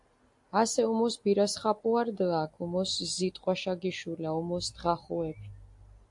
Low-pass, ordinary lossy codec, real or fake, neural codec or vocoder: 10.8 kHz; AAC, 64 kbps; real; none